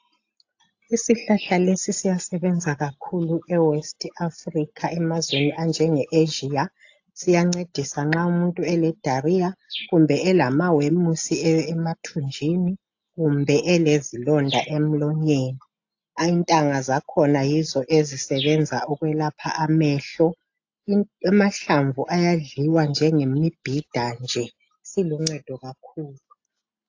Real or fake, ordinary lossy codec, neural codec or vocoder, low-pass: real; AAC, 48 kbps; none; 7.2 kHz